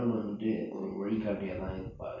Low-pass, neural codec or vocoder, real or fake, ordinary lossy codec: 7.2 kHz; none; real; none